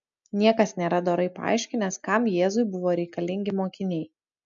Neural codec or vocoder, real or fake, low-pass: none; real; 7.2 kHz